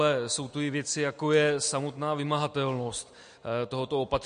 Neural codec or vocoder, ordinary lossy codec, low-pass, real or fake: none; MP3, 48 kbps; 9.9 kHz; real